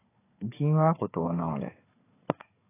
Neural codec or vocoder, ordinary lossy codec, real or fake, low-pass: codec, 16 kHz, 4 kbps, FunCodec, trained on Chinese and English, 50 frames a second; AAC, 16 kbps; fake; 3.6 kHz